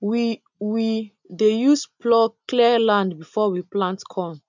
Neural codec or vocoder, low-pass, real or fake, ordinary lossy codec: none; 7.2 kHz; real; none